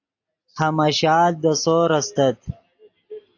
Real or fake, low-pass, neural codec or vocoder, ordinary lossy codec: real; 7.2 kHz; none; AAC, 48 kbps